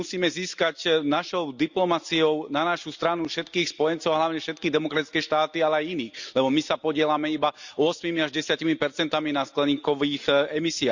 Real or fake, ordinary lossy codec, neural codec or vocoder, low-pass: real; Opus, 64 kbps; none; 7.2 kHz